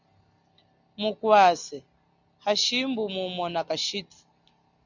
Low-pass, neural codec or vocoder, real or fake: 7.2 kHz; none; real